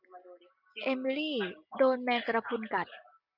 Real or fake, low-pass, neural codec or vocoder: real; 5.4 kHz; none